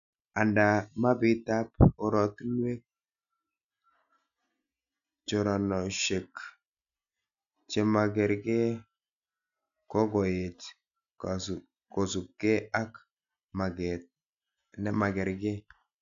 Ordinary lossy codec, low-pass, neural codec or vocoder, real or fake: none; 7.2 kHz; none; real